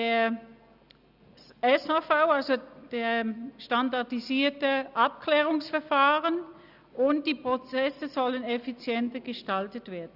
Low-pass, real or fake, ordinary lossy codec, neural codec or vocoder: 5.4 kHz; real; Opus, 64 kbps; none